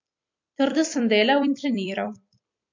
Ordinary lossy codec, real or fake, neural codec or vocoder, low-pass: MP3, 48 kbps; fake; vocoder, 44.1 kHz, 128 mel bands every 256 samples, BigVGAN v2; 7.2 kHz